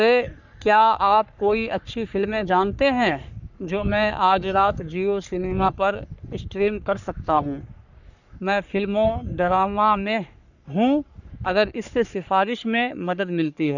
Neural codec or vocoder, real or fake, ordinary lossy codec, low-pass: codec, 44.1 kHz, 3.4 kbps, Pupu-Codec; fake; none; 7.2 kHz